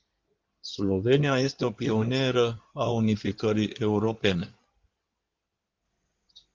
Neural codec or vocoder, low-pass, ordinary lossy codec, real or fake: codec, 16 kHz in and 24 kHz out, 2.2 kbps, FireRedTTS-2 codec; 7.2 kHz; Opus, 32 kbps; fake